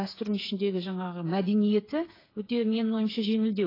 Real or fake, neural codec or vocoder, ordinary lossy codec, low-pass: fake; codec, 16 kHz, 8 kbps, FreqCodec, smaller model; AAC, 24 kbps; 5.4 kHz